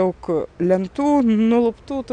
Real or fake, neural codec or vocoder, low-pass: real; none; 9.9 kHz